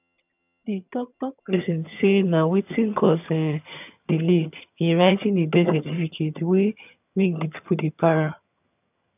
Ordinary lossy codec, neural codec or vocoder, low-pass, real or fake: none; vocoder, 22.05 kHz, 80 mel bands, HiFi-GAN; 3.6 kHz; fake